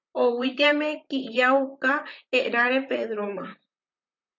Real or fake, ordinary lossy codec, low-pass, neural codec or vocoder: fake; MP3, 48 kbps; 7.2 kHz; vocoder, 44.1 kHz, 128 mel bands, Pupu-Vocoder